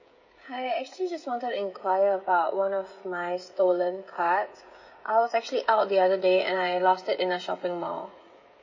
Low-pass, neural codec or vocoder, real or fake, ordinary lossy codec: 7.2 kHz; codec, 16 kHz, 16 kbps, FreqCodec, smaller model; fake; MP3, 32 kbps